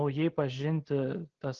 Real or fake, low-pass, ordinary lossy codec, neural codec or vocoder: real; 7.2 kHz; Opus, 16 kbps; none